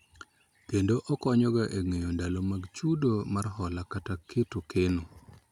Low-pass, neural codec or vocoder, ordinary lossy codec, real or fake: 19.8 kHz; none; none; real